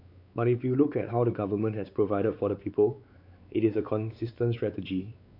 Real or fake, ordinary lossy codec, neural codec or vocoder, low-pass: fake; none; codec, 16 kHz, 4 kbps, X-Codec, WavLM features, trained on Multilingual LibriSpeech; 5.4 kHz